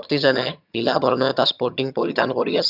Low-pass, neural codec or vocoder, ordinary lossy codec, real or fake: 5.4 kHz; vocoder, 22.05 kHz, 80 mel bands, HiFi-GAN; none; fake